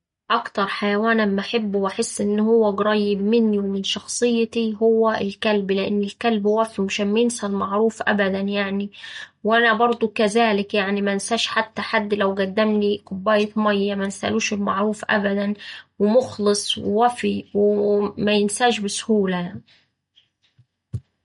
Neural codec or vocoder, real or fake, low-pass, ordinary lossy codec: none; real; 10.8 kHz; MP3, 48 kbps